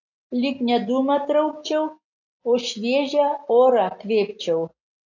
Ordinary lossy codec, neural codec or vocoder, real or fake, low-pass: AAC, 48 kbps; none; real; 7.2 kHz